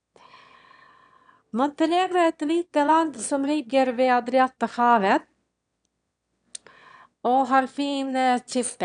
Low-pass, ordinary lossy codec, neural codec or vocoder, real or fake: 9.9 kHz; none; autoencoder, 22.05 kHz, a latent of 192 numbers a frame, VITS, trained on one speaker; fake